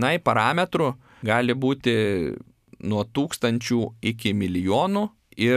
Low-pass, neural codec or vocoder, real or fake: 14.4 kHz; none; real